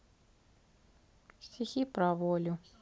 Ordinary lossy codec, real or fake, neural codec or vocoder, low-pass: none; real; none; none